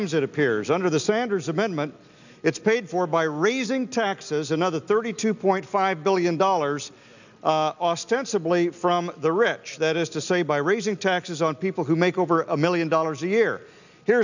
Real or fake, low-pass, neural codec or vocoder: real; 7.2 kHz; none